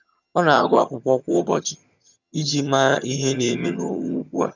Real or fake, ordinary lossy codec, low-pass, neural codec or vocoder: fake; none; 7.2 kHz; vocoder, 22.05 kHz, 80 mel bands, HiFi-GAN